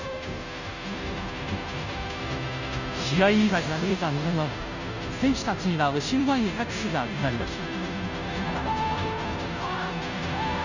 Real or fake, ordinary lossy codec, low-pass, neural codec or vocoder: fake; none; 7.2 kHz; codec, 16 kHz, 0.5 kbps, FunCodec, trained on Chinese and English, 25 frames a second